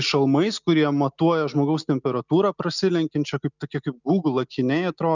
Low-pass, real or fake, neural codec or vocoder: 7.2 kHz; real; none